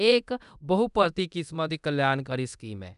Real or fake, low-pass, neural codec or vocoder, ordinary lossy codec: fake; 10.8 kHz; codec, 24 kHz, 0.9 kbps, DualCodec; none